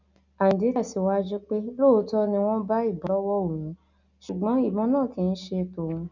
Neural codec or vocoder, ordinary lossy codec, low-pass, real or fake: none; none; 7.2 kHz; real